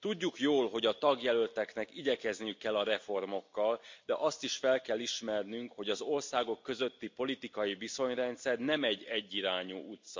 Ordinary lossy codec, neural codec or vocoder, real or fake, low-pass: MP3, 64 kbps; none; real; 7.2 kHz